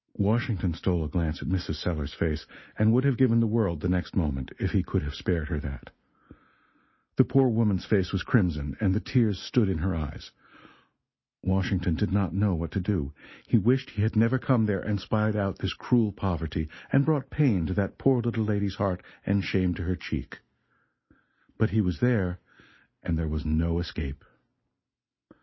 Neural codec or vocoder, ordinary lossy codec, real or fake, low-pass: none; MP3, 24 kbps; real; 7.2 kHz